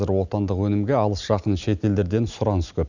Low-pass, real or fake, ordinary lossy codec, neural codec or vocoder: 7.2 kHz; real; none; none